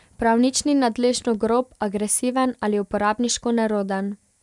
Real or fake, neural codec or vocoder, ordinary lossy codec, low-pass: real; none; none; 10.8 kHz